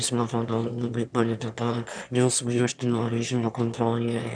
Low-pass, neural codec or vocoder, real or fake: 9.9 kHz; autoencoder, 22.05 kHz, a latent of 192 numbers a frame, VITS, trained on one speaker; fake